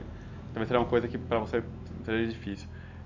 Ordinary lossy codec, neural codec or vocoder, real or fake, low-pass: AAC, 48 kbps; none; real; 7.2 kHz